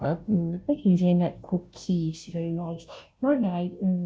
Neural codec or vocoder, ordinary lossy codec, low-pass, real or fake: codec, 16 kHz, 0.5 kbps, FunCodec, trained on Chinese and English, 25 frames a second; none; none; fake